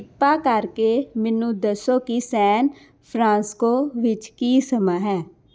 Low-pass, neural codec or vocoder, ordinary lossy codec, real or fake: none; none; none; real